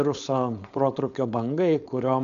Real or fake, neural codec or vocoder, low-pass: fake; codec, 16 kHz, 4.8 kbps, FACodec; 7.2 kHz